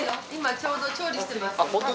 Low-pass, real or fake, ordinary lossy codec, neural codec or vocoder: none; real; none; none